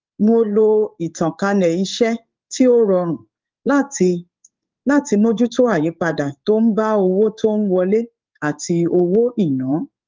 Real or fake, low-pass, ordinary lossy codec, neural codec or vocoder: fake; 7.2 kHz; Opus, 24 kbps; codec, 16 kHz in and 24 kHz out, 1 kbps, XY-Tokenizer